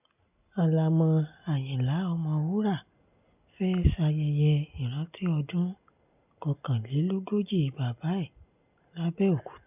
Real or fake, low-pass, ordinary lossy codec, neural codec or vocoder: real; 3.6 kHz; none; none